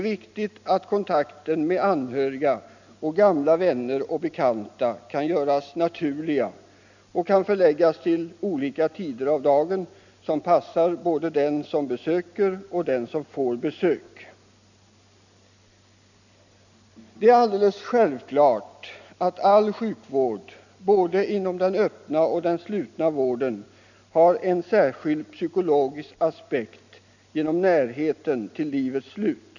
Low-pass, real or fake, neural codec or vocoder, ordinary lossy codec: 7.2 kHz; real; none; none